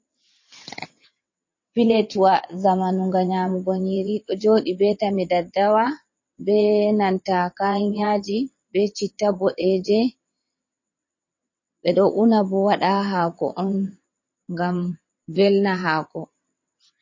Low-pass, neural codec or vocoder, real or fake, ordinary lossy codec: 7.2 kHz; vocoder, 22.05 kHz, 80 mel bands, WaveNeXt; fake; MP3, 32 kbps